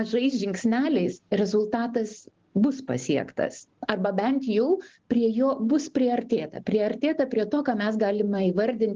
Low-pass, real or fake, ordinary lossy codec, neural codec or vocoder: 7.2 kHz; real; Opus, 16 kbps; none